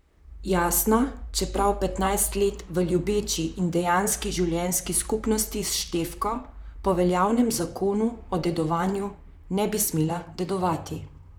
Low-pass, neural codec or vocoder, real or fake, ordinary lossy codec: none; vocoder, 44.1 kHz, 128 mel bands, Pupu-Vocoder; fake; none